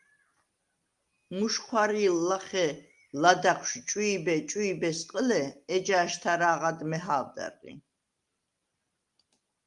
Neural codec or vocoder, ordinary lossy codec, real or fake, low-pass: none; Opus, 32 kbps; real; 10.8 kHz